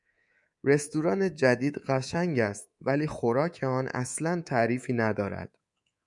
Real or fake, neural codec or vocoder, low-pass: fake; codec, 24 kHz, 3.1 kbps, DualCodec; 10.8 kHz